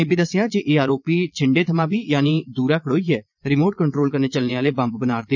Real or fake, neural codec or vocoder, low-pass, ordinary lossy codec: fake; vocoder, 22.05 kHz, 80 mel bands, Vocos; 7.2 kHz; none